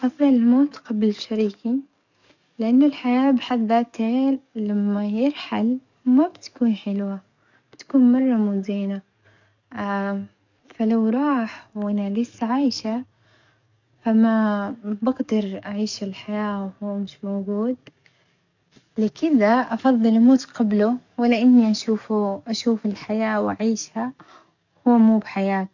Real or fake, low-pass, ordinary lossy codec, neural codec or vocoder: fake; 7.2 kHz; none; codec, 44.1 kHz, 7.8 kbps, DAC